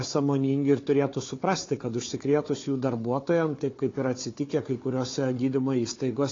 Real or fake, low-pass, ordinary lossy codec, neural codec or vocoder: fake; 7.2 kHz; AAC, 32 kbps; codec, 16 kHz, 4 kbps, FunCodec, trained on Chinese and English, 50 frames a second